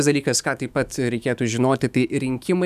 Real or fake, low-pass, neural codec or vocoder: fake; 14.4 kHz; autoencoder, 48 kHz, 128 numbers a frame, DAC-VAE, trained on Japanese speech